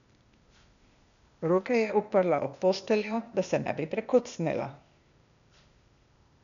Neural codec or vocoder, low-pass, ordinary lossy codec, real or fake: codec, 16 kHz, 0.8 kbps, ZipCodec; 7.2 kHz; none; fake